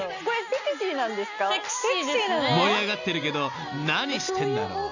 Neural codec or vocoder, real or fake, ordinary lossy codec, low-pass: none; real; none; 7.2 kHz